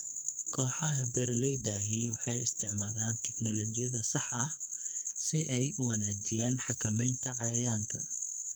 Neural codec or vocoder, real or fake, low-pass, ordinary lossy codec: codec, 44.1 kHz, 2.6 kbps, SNAC; fake; none; none